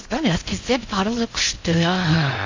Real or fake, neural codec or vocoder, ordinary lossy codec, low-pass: fake; codec, 16 kHz in and 24 kHz out, 0.6 kbps, FocalCodec, streaming, 4096 codes; AAC, 48 kbps; 7.2 kHz